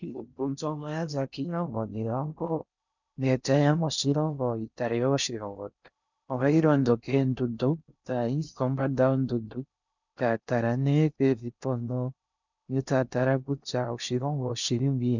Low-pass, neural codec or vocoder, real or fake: 7.2 kHz; codec, 16 kHz in and 24 kHz out, 0.6 kbps, FocalCodec, streaming, 4096 codes; fake